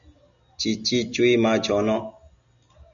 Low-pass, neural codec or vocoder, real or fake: 7.2 kHz; none; real